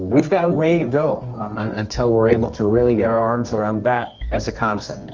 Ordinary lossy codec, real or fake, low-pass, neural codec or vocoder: Opus, 32 kbps; fake; 7.2 kHz; codec, 24 kHz, 0.9 kbps, WavTokenizer, medium music audio release